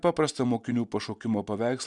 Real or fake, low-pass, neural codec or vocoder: real; 10.8 kHz; none